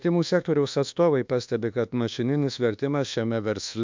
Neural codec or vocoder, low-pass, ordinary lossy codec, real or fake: codec, 24 kHz, 1.2 kbps, DualCodec; 7.2 kHz; MP3, 64 kbps; fake